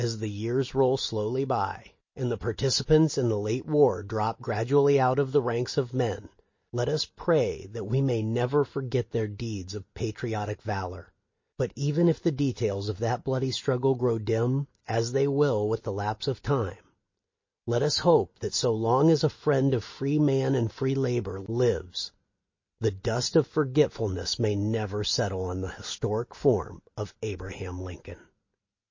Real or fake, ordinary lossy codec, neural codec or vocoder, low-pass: real; MP3, 32 kbps; none; 7.2 kHz